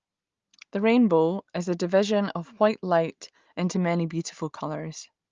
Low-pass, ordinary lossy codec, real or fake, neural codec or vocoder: 7.2 kHz; Opus, 24 kbps; real; none